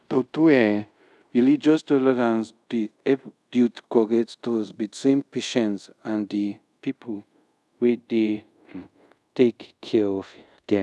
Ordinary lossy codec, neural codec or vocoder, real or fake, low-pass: none; codec, 24 kHz, 0.5 kbps, DualCodec; fake; none